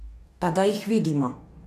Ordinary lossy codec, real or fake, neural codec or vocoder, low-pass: none; fake; codec, 44.1 kHz, 2.6 kbps, DAC; 14.4 kHz